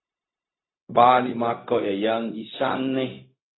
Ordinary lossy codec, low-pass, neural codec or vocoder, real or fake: AAC, 16 kbps; 7.2 kHz; codec, 16 kHz, 0.4 kbps, LongCat-Audio-Codec; fake